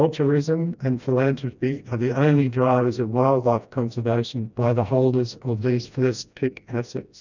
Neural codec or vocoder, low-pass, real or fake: codec, 16 kHz, 1 kbps, FreqCodec, smaller model; 7.2 kHz; fake